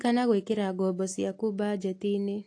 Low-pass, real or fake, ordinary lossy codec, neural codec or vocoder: 9.9 kHz; real; AAC, 48 kbps; none